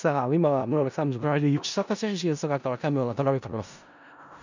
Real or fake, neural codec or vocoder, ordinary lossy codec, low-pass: fake; codec, 16 kHz in and 24 kHz out, 0.4 kbps, LongCat-Audio-Codec, four codebook decoder; none; 7.2 kHz